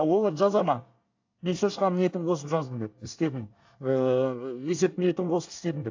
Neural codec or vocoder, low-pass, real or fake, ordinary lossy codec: codec, 24 kHz, 1 kbps, SNAC; 7.2 kHz; fake; AAC, 48 kbps